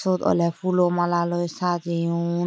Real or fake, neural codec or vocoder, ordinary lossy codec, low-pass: real; none; none; none